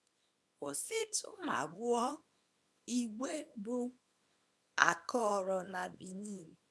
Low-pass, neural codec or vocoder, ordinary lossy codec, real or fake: none; codec, 24 kHz, 0.9 kbps, WavTokenizer, small release; none; fake